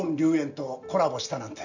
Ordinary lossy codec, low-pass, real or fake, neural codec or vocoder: none; 7.2 kHz; real; none